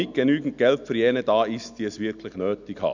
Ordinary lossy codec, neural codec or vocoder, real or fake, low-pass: none; none; real; 7.2 kHz